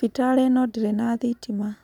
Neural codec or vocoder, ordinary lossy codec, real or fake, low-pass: none; none; real; 19.8 kHz